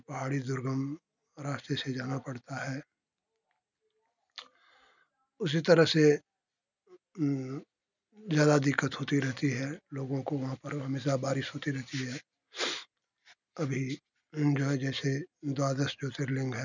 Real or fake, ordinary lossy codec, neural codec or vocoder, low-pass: real; none; none; 7.2 kHz